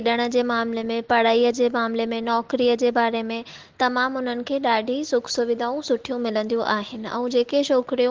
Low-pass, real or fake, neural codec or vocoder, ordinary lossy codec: 7.2 kHz; real; none; Opus, 16 kbps